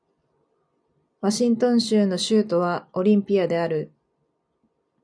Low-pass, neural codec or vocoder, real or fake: 9.9 kHz; none; real